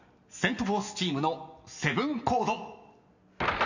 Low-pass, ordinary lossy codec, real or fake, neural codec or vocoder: 7.2 kHz; none; real; none